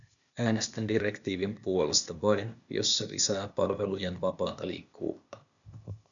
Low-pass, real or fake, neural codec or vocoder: 7.2 kHz; fake; codec, 16 kHz, 0.8 kbps, ZipCodec